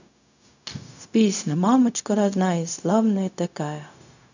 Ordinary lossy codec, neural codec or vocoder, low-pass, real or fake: none; codec, 16 kHz, 0.4 kbps, LongCat-Audio-Codec; 7.2 kHz; fake